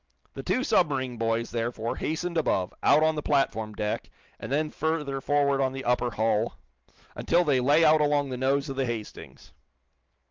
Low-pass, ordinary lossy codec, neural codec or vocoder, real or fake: 7.2 kHz; Opus, 32 kbps; none; real